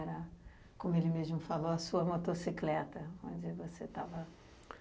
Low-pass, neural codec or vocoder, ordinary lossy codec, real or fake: none; none; none; real